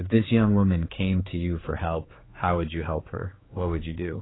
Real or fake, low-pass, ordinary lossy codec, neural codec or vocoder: fake; 7.2 kHz; AAC, 16 kbps; codec, 16 kHz, 4 kbps, X-Codec, HuBERT features, trained on general audio